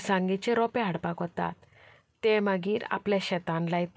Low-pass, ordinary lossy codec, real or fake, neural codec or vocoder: none; none; real; none